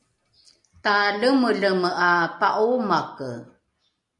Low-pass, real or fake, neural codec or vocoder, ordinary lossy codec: 10.8 kHz; real; none; MP3, 64 kbps